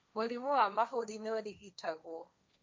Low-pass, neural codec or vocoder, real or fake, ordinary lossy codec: none; codec, 16 kHz, 1.1 kbps, Voila-Tokenizer; fake; none